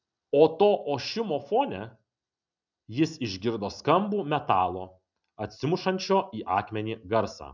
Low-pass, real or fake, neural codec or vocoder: 7.2 kHz; real; none